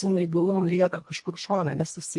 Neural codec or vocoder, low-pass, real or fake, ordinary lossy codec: codec, 24 kHz, 1.5 kbps, HILCodec; 10.8 kHz; fake; MP3, 48 kbps